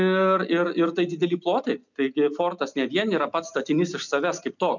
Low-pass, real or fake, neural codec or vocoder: 7.2 kHz; real; none